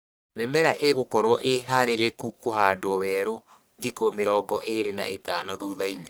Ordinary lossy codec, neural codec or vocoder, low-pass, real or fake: none; codec, 44.1 kHz, 1.7 kbps, Pupu-Codec; none; fake